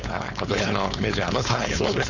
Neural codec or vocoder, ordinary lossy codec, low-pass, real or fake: codec, 16 kHz, 4.8 kbps, FACodec; none; 7.2 kHz; fake